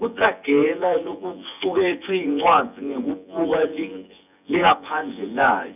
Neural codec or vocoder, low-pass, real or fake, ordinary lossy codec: vocoder, 24 kHz, 100 mel bands, Vocos; 3.6 kHz; fake; none